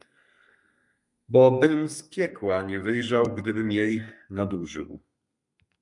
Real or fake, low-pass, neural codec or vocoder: fake; 10.8 kHz; codec, 32 kHz, 1.9 kbps, SNAC